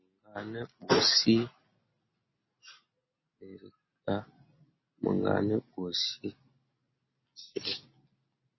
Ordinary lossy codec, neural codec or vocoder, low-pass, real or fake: MP3, 24 kbps; none; 7.2 kHz; real